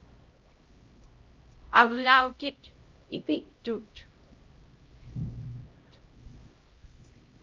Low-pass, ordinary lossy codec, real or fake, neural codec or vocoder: 7.2 kHz; Opus, 24 kbps; fake; codec, 16 kHz, 0.5 kbps, X-Codec, HuBERT features, trained on LibriSpeech